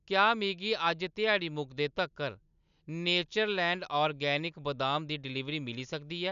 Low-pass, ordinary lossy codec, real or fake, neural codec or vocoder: 7.2 kHz; none; real; none